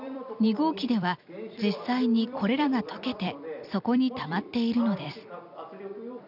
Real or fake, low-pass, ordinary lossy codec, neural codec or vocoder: fake; 5.4 kHz; none; vocoder, 44.1 kHz, 128 mel bands every 256 samples, BigVGAN v2